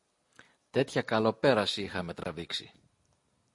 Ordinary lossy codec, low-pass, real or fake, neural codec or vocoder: MP3, 48 kbps; 10.8 kHz; real; none